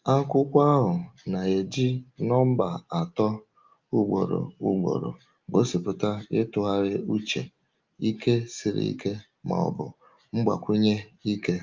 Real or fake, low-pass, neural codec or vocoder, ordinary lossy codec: real; 7.2 kHz; none; Opus, 24 kbps